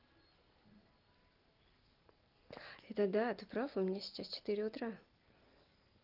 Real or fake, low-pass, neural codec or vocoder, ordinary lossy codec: real; 5.4 kHz; none; Opus, 32 kbps